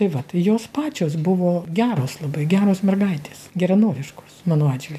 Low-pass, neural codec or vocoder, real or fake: 14.4 kHz; none; real